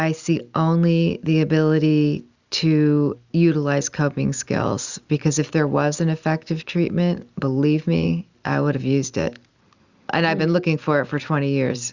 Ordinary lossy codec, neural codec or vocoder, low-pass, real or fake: Opus, 64 kbps; none; 7.2 kHz; real